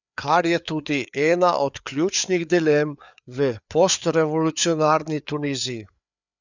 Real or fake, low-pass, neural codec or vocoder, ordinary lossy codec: fake; 7.2 kHz; codec, 16 kHz, 4 kbps, FreqCodec, larger model; none